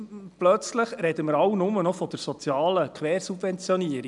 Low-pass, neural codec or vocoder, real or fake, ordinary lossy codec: 10.8 kHz; none; real; none